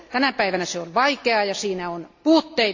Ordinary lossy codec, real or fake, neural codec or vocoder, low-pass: AAC, 48 kbps; real; none; 7.2 kHz